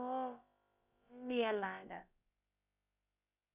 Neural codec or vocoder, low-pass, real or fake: codec, 16 kHz, about 1 kbps, DyCAST, with the encoder's durations; 3.6 kHz; fake